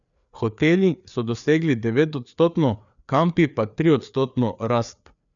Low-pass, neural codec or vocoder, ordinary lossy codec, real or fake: 7.2 kHz; codec, 16 kHz, 4 kbps, FreqCodec, larger model; none; fake